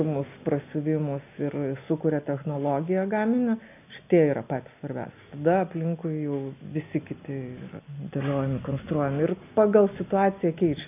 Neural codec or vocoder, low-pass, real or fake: none; 3.6 kHz; real